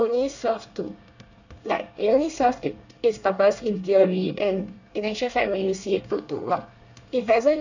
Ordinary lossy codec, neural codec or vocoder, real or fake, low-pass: none; codec, 24 kHz, 1 kbps, SNAC; fake; 7.2 kHz